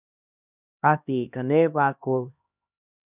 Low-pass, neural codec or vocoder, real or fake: 3.6 kHz; codec, 16 kHz, 1 kbps, X-Codec, HuBERT features, trained on LibriSpeech; fake